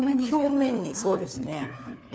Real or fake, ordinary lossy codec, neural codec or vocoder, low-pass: fake; none; codec, 16 kHz, 4 kbps, FunCodec, trained on LibriTTS, 50 frames a second; none